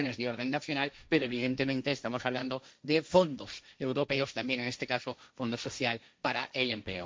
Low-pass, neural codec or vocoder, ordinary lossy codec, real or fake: none; codec, 16 kHz, 1.1 kbps, Voila-Tokenizer; none; fake